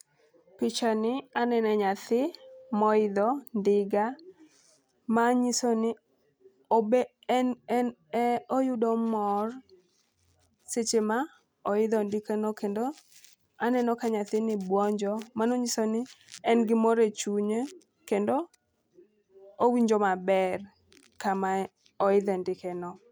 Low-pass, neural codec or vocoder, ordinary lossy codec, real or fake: none; none; none; real